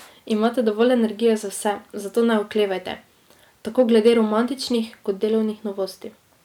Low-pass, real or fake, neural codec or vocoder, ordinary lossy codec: 19.8 kHz; real; none; none